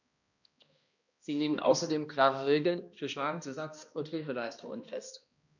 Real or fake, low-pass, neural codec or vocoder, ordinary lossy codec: fake; 7.2 kHz; codec, 16 kHz, 1 kbps, X-Codec, HuBERT features, trained on balanced general audio; none